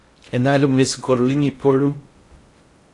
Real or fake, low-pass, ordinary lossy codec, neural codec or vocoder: fake; 10.8 kHz; AAC, 48 kbps; codec, 16 kHz in and 24 kHz out, 0.6 kbps, FocalCodec, streaming, 4096 codes